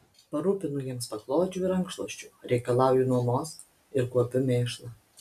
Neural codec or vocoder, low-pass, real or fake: none; 14.4 kHz; real